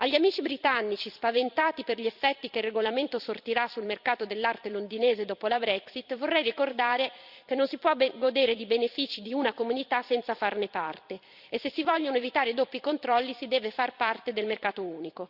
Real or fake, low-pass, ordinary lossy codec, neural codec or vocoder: fake; 5.4 kHz; Opus, 64 kbps; vocoder, 44.1 kHz, 128 mel bands every 256 samples, BigVGAN v2